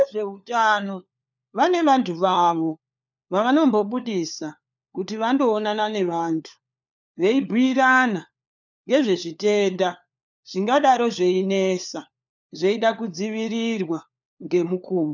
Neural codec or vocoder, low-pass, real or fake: codec, 16 kHz, 4 kbps, FunCodec, trained on LibriTTS, 50 frames a second; 7.2 kHz; fake